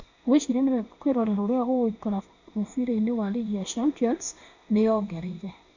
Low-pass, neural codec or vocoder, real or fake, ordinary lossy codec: 7.2 kHz; codec, 16 kHz in and 24 kHz out, 1 kbps, XY-Tokenizer; fake; none